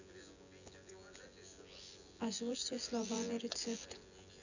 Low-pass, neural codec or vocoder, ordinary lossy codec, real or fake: 7.2 kHz; vocoder, 24 kHz, 100 mel bands, Vocos; none; fake